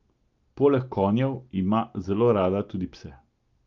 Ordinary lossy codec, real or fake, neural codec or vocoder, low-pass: Opus, 32 kbps; real; none; 7.2 kHz